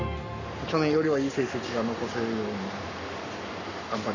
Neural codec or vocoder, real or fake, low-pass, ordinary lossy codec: codec, 44.1 kHz, 7.8 kbps, Pupu-Codec; fake; 7.2 kHz; none